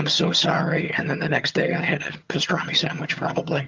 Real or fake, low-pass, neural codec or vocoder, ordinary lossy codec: fake; 7.2 kHz; vocoder, 22.05 kHz, 80 mel bands, HiFi-GAN; Opus, 24 kbps